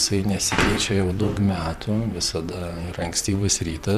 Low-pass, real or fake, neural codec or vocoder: 14.4 kHz; fake; vocoder, 44.1 kHz, 128 mel bands, Pupu-Vocoder